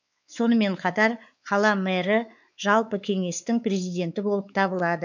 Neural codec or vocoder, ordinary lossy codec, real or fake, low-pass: codec, 16 kHz, 4 kbps, X-Codec, WavLM features, trained on Multilingual LibriSpeech; none; fake; 7.2 kHz